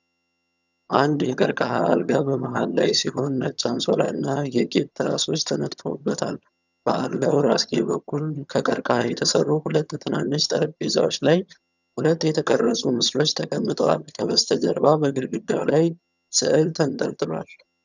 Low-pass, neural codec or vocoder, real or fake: 7.2 kHz; vocoder, 22.05 kHz, 80 mel bands, HiFi-GAN; fake